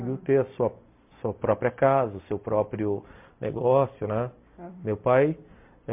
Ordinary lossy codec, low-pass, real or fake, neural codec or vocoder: MP3, 32 kbps; 3.6 kHz; real; none